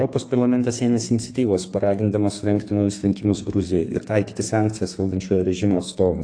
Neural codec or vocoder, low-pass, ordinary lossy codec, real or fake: codec, 32 kHz, 1.9 kbps, SNAC; 9.9 kHz; AAC, 48 kbps; fake